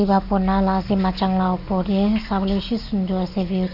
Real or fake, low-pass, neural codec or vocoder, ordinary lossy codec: real; 5.4 kHz; none; none